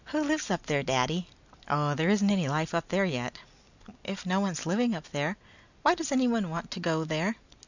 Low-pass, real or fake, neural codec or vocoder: 7.2 kHz; real; none